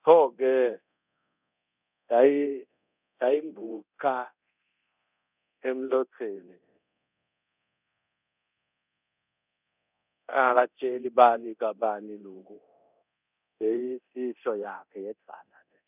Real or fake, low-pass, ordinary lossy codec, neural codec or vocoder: fake; 3.6 kHz; none; codec, 24 kHz, 0.9 kbps, DualCodec